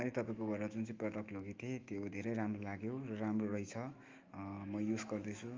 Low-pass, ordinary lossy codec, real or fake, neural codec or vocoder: 7.2 kHz; Opus, 32 kbps; fake; autoencoder, 48 kHz, 128 numbers a frame, DAC-VAE, trained on Japanese speech